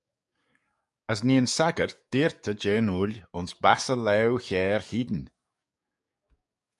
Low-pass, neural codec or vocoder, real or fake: 10.8 kHz; codec, 44.1 kHz, 7.8 kbps, DAC; fake